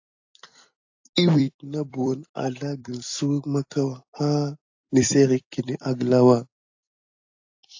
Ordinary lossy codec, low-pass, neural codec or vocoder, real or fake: AAC, 48 kbps; 7.2 kHz; vocoder, 44.1 kHz, 128 mel bands every 256 samples, BigVGAN v2; fake